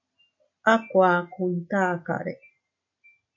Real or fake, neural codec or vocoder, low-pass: real; none; 7.2 kHz